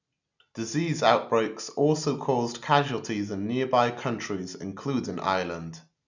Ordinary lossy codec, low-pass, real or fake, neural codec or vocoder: none; 7.2 kHz; real; none